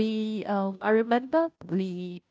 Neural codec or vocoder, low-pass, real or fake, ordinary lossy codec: codec, 16 kHz, 0.5 kbps, FunCodec, trained on Chinese and English, 25 frames a second; none; fake; none